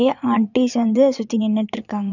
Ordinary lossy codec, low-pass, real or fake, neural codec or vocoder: none; 7.2 kHz; fake; vocoder, 22.05 kHz, 80 mel bands, Vocos